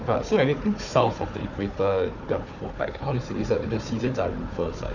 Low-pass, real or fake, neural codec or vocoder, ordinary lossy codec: 7.2 kHz; fake; codec, 16 kHz, 4 kbps, FunCodec, trained on Chinese and English, 50 frames a second; none